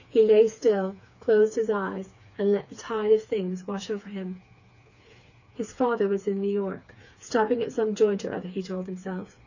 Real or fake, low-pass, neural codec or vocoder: fake; 7.2 kHz; codec, 16 kHz, 4 kbps, FreqCodec, smaller model